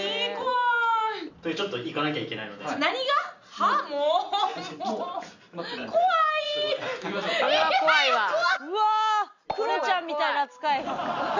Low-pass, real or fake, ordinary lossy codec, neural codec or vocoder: 7.2 kHz; real; none; none